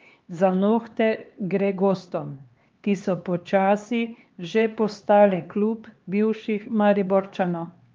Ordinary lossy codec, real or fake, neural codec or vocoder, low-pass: Opus, 32 kbps; fake; codec, 16 kHz, 2 kbps, X-Codec, HuBERT features, trained on LibriSpeech; 7.2 kHz